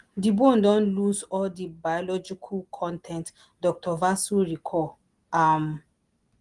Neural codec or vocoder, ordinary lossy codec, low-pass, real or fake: none; Opus, 24 kbps; 10.8 kHz; real